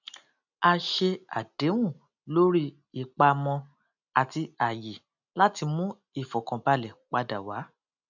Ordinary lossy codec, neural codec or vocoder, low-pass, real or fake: none; none; 7.2 kHz; real